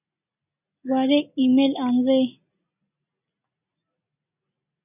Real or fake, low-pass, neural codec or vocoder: real; 3.6 kHz; none